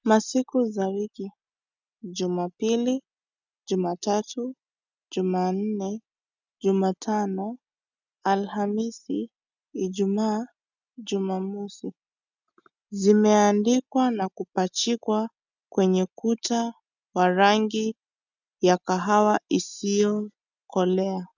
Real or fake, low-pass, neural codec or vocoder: real; 7.2 kHz; none